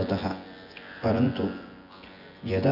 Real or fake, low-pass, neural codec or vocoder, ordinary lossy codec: fake; 5.4 kHz; vocoder, 24 kHz, 100 mel bands, Vocos; AAC, 32 kbps